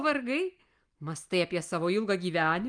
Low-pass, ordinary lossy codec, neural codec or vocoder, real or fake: 9.9 kHz; Opus, 32 kbps; none; real